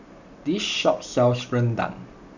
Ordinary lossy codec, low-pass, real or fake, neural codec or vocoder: none; 7.2 kHz; real; none